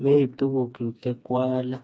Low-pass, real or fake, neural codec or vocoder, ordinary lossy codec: none; fake; codec, 16 kHz, 2 kbps, FreqCodec, smaller model; none